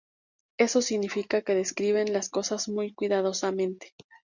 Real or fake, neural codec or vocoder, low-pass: real; none; 7.2 kHz